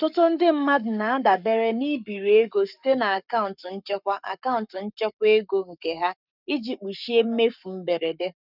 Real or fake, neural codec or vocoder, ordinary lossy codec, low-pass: fake; codec, 44.1 kHz, 7.8 kbps, Pupu-Codec; none; 5.4 kHz